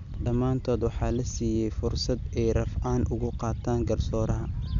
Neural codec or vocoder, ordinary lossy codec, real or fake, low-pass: none; none; real; 7.2 kHz